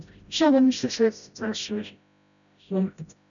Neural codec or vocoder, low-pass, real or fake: codec, 16 kHz, 0.5 kbps, FreqCodec, smaller model; 7.2 kHz; fake